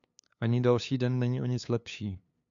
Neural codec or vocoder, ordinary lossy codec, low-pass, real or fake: codec, 16 kHz, 2 kbps, FunCodec, trained on LibriTTS, 25 frames a second; MP3, 64 kbps; 7.2 kHz; fake